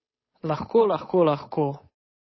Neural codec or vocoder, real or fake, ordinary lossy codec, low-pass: codec, 16 kHz, 8 kbps, FunCodec, trained on Chinese and English, 25 frames a second; fake; MP3, 24 kbps; 7.2 kHz